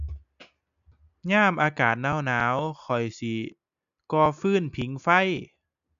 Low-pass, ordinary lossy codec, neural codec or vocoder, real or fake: 7.2 kHz; none; none; real